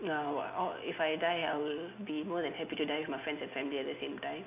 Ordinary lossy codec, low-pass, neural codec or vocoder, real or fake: AAC, 32 kbps; 3.6 kHz; none; real